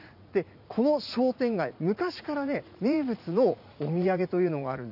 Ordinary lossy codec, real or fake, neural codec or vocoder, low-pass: none; fake; vocoder, 44.1 kHz, 80 mel bands, Vocos; 5.4 kHz